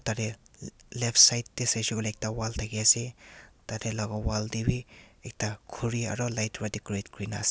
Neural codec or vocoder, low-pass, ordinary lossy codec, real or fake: none; none; none; real